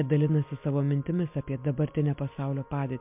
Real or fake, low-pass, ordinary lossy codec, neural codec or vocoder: real; 3.6 kHz; MP3, 32 kbps; none